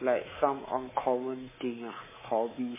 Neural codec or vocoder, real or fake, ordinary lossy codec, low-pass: codec, 24 kHz, 3.1 kbps, DualCodec; fake; MP3, 16 kbps; 3.6 kHz